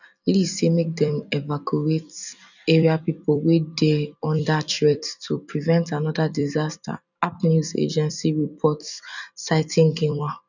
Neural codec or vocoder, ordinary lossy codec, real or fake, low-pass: vocoder, 44.1 kHz, 128 mel bands every 256 samples, BigVGAN v2; none; fake; 7.2 kHz